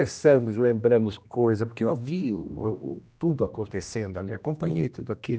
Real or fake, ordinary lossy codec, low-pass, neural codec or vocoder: fake; none; none; codec, 16 kHz, 1 kbps, X-Codec, HuBERT features, trained on general audio